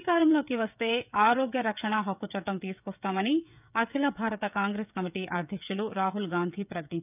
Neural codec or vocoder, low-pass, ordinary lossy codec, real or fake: codec, 16 kHz, 16 kbps, FreqCodec, smaller model; 3.6 kHz; none; fake